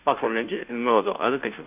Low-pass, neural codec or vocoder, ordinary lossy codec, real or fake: 3.6 kHz; codec, 16 kHz, 0.5 kbps, FunCodec, trained on Chinese and English, 25 frames a second; none; fake